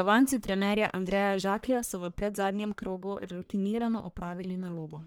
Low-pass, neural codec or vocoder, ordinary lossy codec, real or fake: none; codec, 44.1 kHz, 1.7 kbps, Pupu-Codec; none; fake